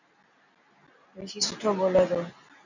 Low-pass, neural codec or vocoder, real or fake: 7.2 kHz; none; real